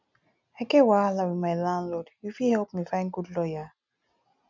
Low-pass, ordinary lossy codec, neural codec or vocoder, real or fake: 7.2 kHz; none; none; real